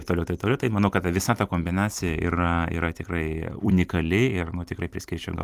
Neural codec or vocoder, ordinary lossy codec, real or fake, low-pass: vocoder, 44.1 kHz, 128 mel bands every 256 samples, BigVGAN v2; Opus, 32 kbps; fake; 14.4 kHz